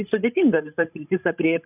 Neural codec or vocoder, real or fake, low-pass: codec, 16 kHz, 16 kbps, FreqCodec, larger model; fake; 3.6 kHz